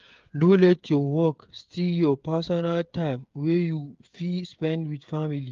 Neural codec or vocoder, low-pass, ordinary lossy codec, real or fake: codec, 16 kHz, 8 kbps, FreqCodec, smaller model; 7.2 kHz; Opus, 32 kbps; fake